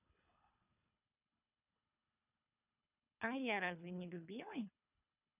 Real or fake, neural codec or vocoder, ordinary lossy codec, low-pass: fake; codec, 24 kHz, 3 kbps, HILCodec; none; 3.6 kHz